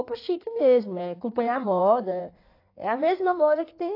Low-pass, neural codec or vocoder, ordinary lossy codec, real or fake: 5.4 kHz; codec, 16 kHz in and 24 kHz out, 1.1 kbps, FireRedTTS-2 codec; AAC, 32 kbps; fake